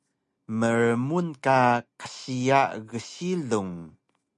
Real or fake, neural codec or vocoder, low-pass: real; none; 10.8 kHz